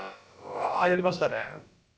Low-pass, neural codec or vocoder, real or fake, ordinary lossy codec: none; codec, 16 kHz, about 1 kbps, DyCAST, with the encoder's durations; fake; none